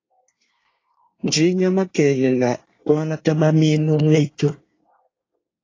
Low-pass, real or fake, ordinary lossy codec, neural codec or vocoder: 7.2 kHz; fake; AAC, 32 kbps; codec, 24 kHz, 1 kbps, SNAC